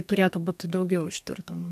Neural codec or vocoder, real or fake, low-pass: codec, 32 kHz, 1.9 kbps, SNAC; fake; 14.4 kHz